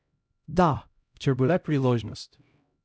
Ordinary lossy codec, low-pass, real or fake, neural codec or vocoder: none; none; fake; codec, 16 kHz, 0.5 kbps, X-Codec, HuBERT features, trained on LibriSpeech